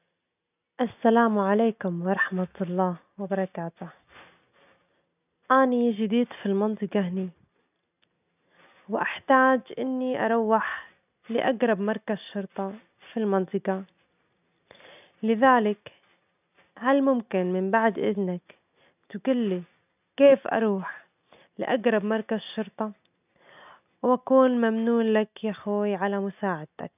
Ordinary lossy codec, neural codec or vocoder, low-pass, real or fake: none; none; 3.6 kHz; real